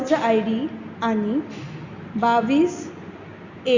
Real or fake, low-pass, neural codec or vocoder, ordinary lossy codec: real; 7.2 kHz; none; Opus, 64 kbps